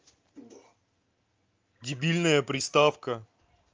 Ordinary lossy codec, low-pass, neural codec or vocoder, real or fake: Opus, 24 kbps; 7.2 kHz; none; real